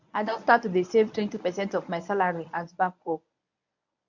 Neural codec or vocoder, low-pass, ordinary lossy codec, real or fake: codec, 24 kHz, 0.9 kbps, WavTokenizer, medium speech release version 1; 7.2 kHz; none; fake